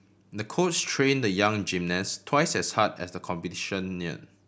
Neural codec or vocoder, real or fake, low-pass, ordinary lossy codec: none; real; none; none